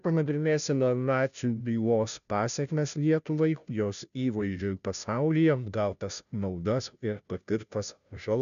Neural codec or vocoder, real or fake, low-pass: codec, 16 kHz, 0.5 kbps, FunCodec, trained on Chinese and English, 25 frames a second; fake; 7.2 kHz